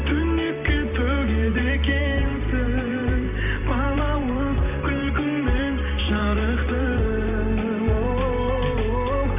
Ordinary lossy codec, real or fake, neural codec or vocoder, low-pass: none; fake; vocoder, 44.1 kHz, 128 mel bands every 512 samples, BigVGAN v2; 3.6 kHz